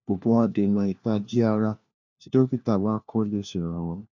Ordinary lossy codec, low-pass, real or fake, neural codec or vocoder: none; 7.2 kHz; fake; codec, 16 kHz, 1 kbps, FunCodec, trained on LibriTTS, 50 frames a second